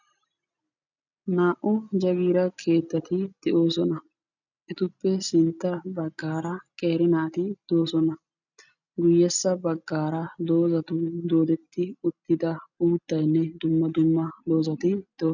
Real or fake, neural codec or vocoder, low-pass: real; none; 7.2 kHz